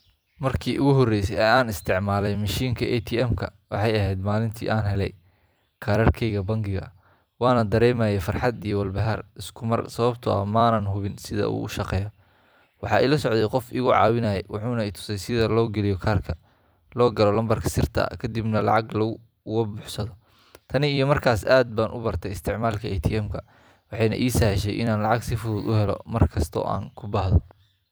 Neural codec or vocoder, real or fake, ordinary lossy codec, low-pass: vocoder, 44.1 kHz, 128 mel bands every 256 samples, BigVGAN v2; fake; none; none